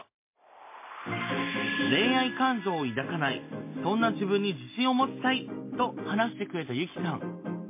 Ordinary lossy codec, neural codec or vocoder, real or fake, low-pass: MP3, 24 kbps; none; real; 3.6 kHz